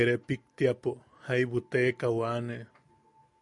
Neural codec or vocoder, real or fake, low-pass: none; real; 10.8 kHz